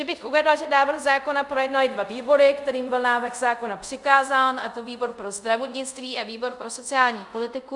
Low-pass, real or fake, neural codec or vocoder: 10.8 kHz; fake; codec, 24 kHz, 0.5 kbps, DualCodec